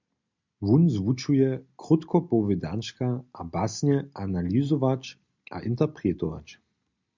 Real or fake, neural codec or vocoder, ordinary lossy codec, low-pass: real; none; MP3, 64 kbps; 7.2 kHz